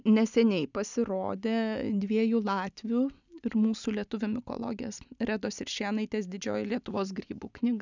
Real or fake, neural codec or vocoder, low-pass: real; none; 7.2 kHz